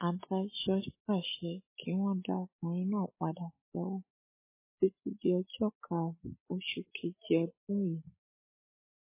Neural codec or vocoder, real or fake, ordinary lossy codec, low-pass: none; real; MP3, 16 kbps; 3.6 kHz